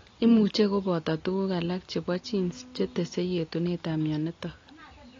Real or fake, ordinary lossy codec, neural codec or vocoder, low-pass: real; AAC, 32 kbps; none; 7.2 kHz